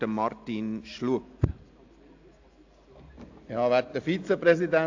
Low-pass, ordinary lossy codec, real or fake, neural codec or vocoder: 7.2 kHz; AAC, 48 kbps; real; none